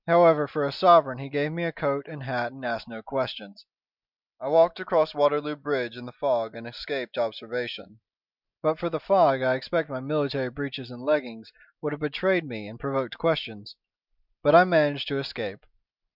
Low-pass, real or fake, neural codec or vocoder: 5.4 kHz; real; none